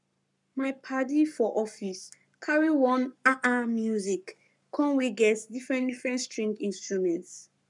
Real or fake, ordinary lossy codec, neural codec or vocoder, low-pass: fake; none; codec, 44.1 kHz, 7.8 kbps, Pupu-Codec; 10.8 kHz